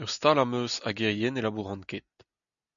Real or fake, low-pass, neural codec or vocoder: real; 7.2 kHz; none